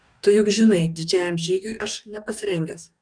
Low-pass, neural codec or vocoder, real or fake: 9.9 kHz; codec, 44.1 kHz, 2.6 kbps, DAC; fake